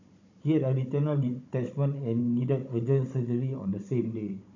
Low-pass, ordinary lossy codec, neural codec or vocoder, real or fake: 7.2 kHz; AAC, 48 kbps; codec, 16 kHz, 16 kbps, FunCodec, trained on Chinese and English, 50 frames a second; fake